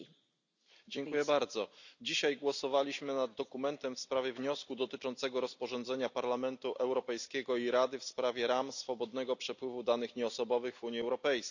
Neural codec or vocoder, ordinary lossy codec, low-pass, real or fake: none; none; 7.2 kHz; real